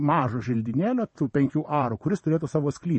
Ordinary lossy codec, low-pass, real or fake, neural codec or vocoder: MP3, 32 kbps; 9.9 kHz; fake; vocoder, 22.05 kHz, 80 mel bands, WaveNeXt